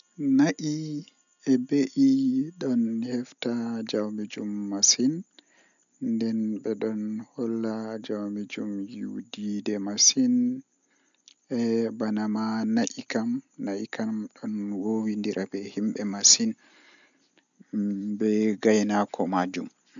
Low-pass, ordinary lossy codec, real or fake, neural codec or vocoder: 7.2 kHz; none; real; none